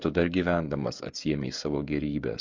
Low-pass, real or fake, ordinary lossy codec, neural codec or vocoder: 7.2 kHz; fake; MP3, 48 kbps; codec, 16 kHz, 16 kbps, FreqCodec, smaller model